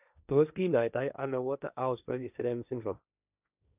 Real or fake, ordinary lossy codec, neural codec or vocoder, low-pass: fake; none; codec, 16 kHz, 1.1 kbps, Voila-Tokenizer; 3.6 kHz